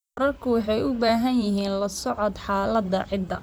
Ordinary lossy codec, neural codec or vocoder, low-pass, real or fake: none; vocoder, 44.1 kHz, 128 mel bands, Pupu-Vocoder; none; fake